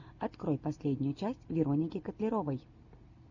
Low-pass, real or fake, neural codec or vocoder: 7.2 kHz; real; none